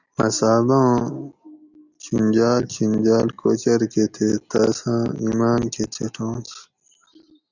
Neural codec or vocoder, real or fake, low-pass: none; real; 7.2 kHz